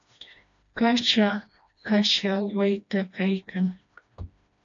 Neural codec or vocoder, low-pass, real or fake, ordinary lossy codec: codec, 16 kHz, 1 kbps, FreqCodec, smaller model; 7.2 kHz; fake; AAC, 64 kbps